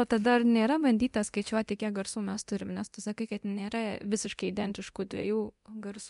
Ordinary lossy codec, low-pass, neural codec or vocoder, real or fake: MP3, 64 kbps; 10.8 kHz; codec, 24 kHz, 0.9 kbps, DualCodec; fake